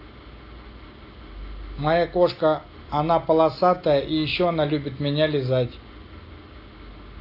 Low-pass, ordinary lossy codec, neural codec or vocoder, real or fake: 5.4 kHz; AAC, 32 kbps; none; real